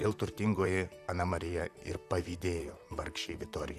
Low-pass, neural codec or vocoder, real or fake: 14.4 kHz; vocoder, 44.1 kHz, 128 mel bands, Pupu-Vocoder; fake